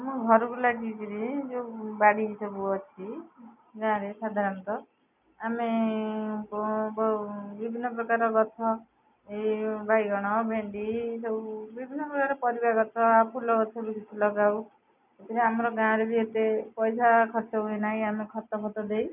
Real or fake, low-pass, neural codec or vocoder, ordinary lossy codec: real; 3.6 kHz; none; none